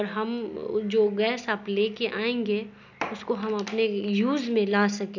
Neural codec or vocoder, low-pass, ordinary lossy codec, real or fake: none; 7.2 kHz; none; real